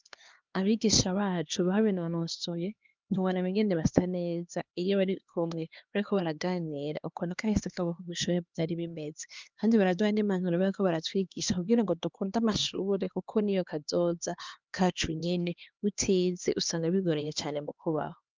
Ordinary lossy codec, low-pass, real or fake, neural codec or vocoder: Opus, 32 kbps; 7.2 kHz; fake; codec, 16 kHz, 2 kbps, X-Codec, HuBERT features, trained on LibriSpeech